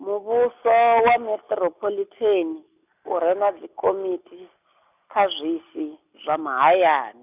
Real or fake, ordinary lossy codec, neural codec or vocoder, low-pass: real; none; none; 3.6 kHz